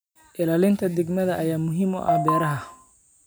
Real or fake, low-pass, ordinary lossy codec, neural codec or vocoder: real; none; none; none